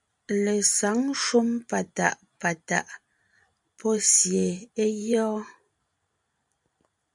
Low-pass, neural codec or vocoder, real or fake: 10.8 kHz; vocoder, 44.1 kHz, 128 mel bands every 512 samples, BigVGAN v2; fake